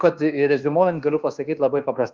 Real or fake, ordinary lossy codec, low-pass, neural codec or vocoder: fake; Opus, 32 kbps; 7.2 kHz; codec, 24 kHz, 1.2 kbps, DualCodec